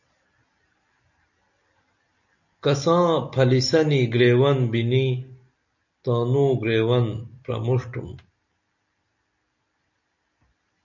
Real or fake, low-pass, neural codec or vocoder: real; 7.2 kHz; none